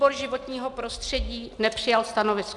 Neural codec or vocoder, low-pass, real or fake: none; 10.8 kHz; real